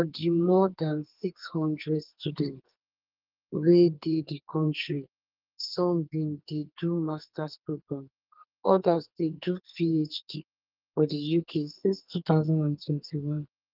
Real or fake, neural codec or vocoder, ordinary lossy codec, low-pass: fake; codec, 44.1 kHz, 2.6 kbps, SNAC; Opus, 32 kbps; 5.4 kHz